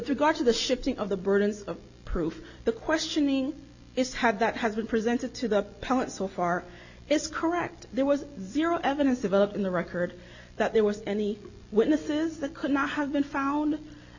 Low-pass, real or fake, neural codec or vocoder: 7.2 kHz; real; none